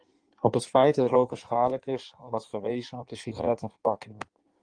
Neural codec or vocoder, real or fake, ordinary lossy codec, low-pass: codec, 16 kHz in and 24 kHz out, 1.1 kbps, FireRedTTS-2 codec; fake; Opus, 24 kbps; 9.9 kHz